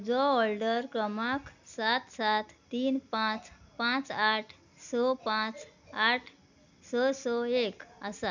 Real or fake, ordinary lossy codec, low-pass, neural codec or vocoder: real; none; 7.2 kHz; none